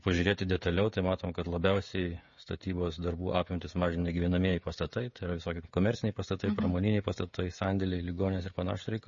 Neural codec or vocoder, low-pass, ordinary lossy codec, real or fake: codec, 16 kHz, 16 kbps, FreqCodec, smaller model; 7.2 kHz; MP3, 32 kbps; fake